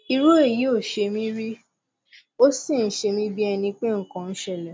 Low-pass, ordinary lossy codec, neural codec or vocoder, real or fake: none; none; none; real